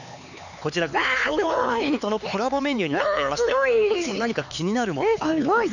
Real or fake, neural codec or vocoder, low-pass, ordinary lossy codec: fake; codec, 16 kHz, 4 kbps, X-Codec, HuBERT features, trained on LibriSpeech; 7.2 kHz; none